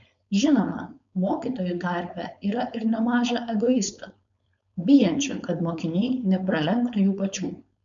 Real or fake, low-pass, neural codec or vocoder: fake; 7.2 kHz; codec, 16 kHz, 4.8 kbps, FACodec